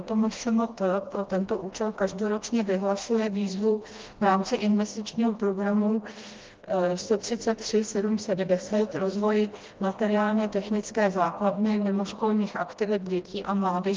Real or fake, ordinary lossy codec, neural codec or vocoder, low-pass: fake; Opus, 24 kbps; codec, 16 kHz, 1 kbps, FreqCodec, smaller model; 7.2 kHz